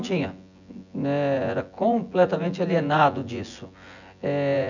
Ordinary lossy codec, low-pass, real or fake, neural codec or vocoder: none; 7.2 kHz; fake; vocoder, 24 kHz, 100 mel bands, Vocos